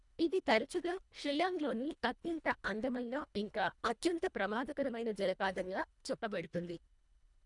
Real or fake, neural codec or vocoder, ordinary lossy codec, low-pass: fake; codec, 24 kHz, 1.5 kbps, HILCodec; none; 10.8 kHz